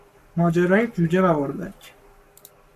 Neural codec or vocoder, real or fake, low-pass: codec, 44.1 kHz, 7.8 kbps, Pupu-Codec; fake; 14.4 kHz